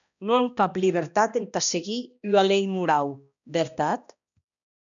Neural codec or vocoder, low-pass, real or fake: codec, 16 kHz, 1 kbps, X-Codec, HuBERT features, trained on balanced general audio; 7.2 kHz; fake